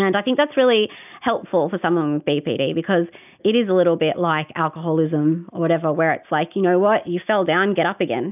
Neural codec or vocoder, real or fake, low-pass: none; real; 3.6 kHz